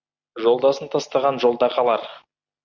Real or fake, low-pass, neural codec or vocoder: real; 7.2 kHz; none